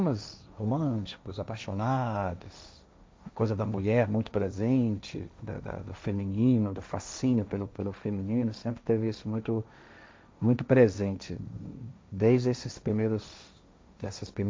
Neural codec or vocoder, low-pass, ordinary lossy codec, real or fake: codec, 16 kHz, 1.1 kbps, Voila-Tokenizer; none; none; fake